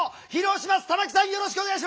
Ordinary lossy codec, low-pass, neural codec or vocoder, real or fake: none; none; none; real